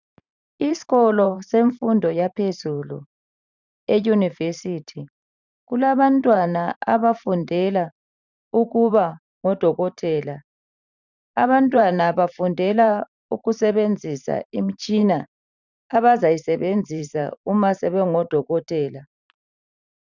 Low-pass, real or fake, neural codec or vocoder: 7.2 kHz; fake; vocoder, 44.1 kHz, 128 mel bands every 512 samples, BigVGAN v2